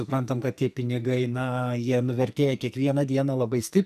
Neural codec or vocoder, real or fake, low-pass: codec, 44.1 kHz, 2.6 kbps, SNAC; fake; 14.4 kHz